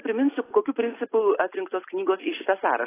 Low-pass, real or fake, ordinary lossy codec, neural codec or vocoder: 3.6 kHz; real; AAC, 16 kbps; none